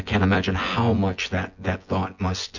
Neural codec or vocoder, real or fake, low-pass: vocoder, 24 kHz, 100 mel bands, Vocos; fake; 7.2 kHz